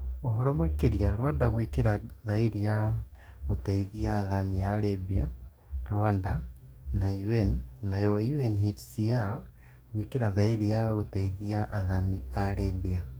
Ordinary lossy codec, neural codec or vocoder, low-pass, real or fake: none; codec, 44.1 kHz, 2.6 kbps, DAC; none; fake